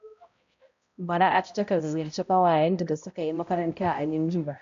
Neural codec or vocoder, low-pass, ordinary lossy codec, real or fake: codec, 16 kHz, 0.5 kbps, X-Codec, HuBERT features, trained on balanced general audio; 7.2 kHz; MP3, 64 kbps; fake